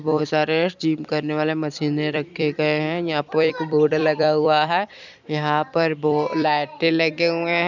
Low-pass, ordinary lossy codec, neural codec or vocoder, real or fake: 7.2 kHz; none; vocoder, 44.1 kHz, 128 mel bands every 256 samples, BigVGAN v2; fake